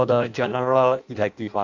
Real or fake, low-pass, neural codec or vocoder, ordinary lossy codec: fake; 7.2 kHz; codec, 16 kHz in and 24 kHz out, 0.6 kbps, FireRedTTS-2 codec; none